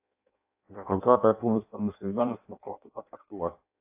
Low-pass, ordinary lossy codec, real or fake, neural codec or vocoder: 3.6 kHz; AAC, 32 kbps; fake; codec, 16 kHz in and 24 kHz out, 0.6 kbps, FireRedTTS-2 codec